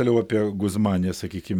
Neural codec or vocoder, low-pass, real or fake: none; 19.8 kHz; real